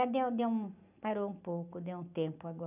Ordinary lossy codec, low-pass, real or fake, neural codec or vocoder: none; 3.6 kHz; real; none